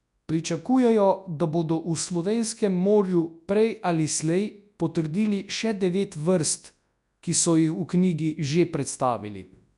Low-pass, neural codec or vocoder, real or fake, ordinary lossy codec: 10.8 kHz; codec, 24 kHz, 0.9 kbps, WavTokenizer, large speech release; fake; none